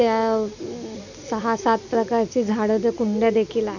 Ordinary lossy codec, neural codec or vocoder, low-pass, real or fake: none; none; 7.2 kHz; real